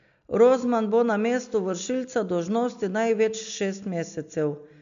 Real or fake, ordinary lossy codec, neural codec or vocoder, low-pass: real; MP3, 64 kbps; none; 7.2 kHz